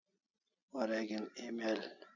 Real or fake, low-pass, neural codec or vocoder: real; 7.2 kHz; none